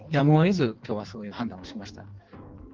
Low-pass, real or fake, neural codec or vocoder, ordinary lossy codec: 7.2 kHz; fake; codec, 16 kHz in and 24 kHz out, 1.1 kbps, FireRedTTS-2 codec; Opus, 16 kbps